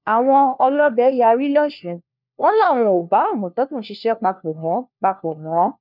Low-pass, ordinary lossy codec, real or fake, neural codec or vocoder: 5.4 kHz; none; fake; codec, 16 kHz, 1 kbps, FunCodec, trained on LibriTTS, 50 frames a second